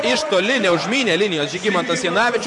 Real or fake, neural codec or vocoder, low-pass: fake; vocoder, 24 kHz, 100 mel bands, Vocos; 10.8 kHz